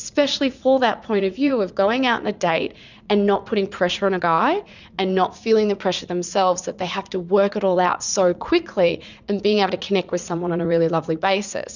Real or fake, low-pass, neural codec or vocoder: fake; 7.2 kHz; vocoder, 44.1 kHz, 80 mel bands, Vocos